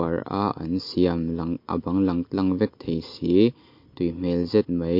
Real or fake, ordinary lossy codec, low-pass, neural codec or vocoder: real; MP3, 32 kbps; 5.4 kHz; none